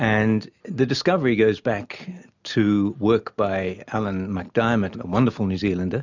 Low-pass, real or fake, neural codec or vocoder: 7.2 kHz; real; none